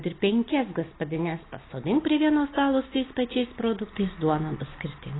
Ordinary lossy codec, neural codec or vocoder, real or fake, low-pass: AAC, 16 kbps; none; real; 7.2 kHz